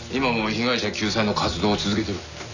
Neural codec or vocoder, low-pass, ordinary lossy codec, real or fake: none; 7.2 kHz; none; real